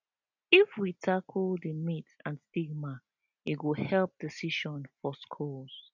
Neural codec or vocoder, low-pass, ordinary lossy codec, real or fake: none; 7.2 kHz; none; real